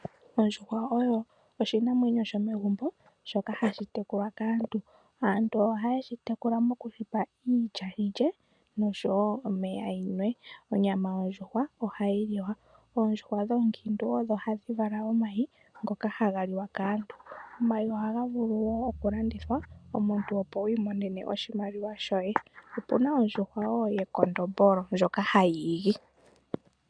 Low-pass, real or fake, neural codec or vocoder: 9.9 kHz; real; none